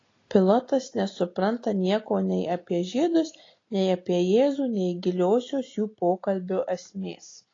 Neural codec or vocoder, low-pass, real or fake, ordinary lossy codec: none; 7.2 kHz; real; AAC, 32 kbps